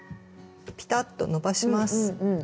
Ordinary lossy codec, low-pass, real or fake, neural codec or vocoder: none; none; real; none